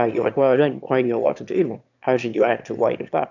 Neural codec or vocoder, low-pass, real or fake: autoencoder, 22.05 kHz, a latent of 192 numbers a frame, VITS, trained on one speaker; 7.2 kHz; fake